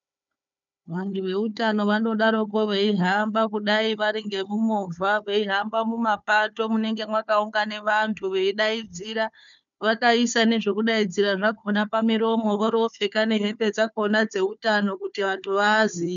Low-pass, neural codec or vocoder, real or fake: 7.2 kHz; codec, 16 kHz, 4 kbps, FunCodec, trained on Chinese and English, 50 frames a second; fake